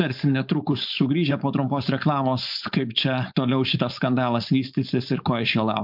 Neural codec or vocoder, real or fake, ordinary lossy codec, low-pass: codec, 16 kHz, 4.8 kbps, FACodec; fake; MP3, 48 kbps; 5.4 kHz